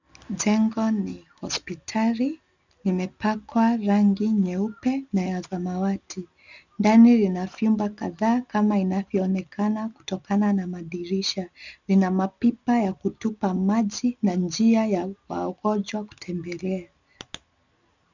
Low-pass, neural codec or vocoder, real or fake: 7.2 kHz; none; real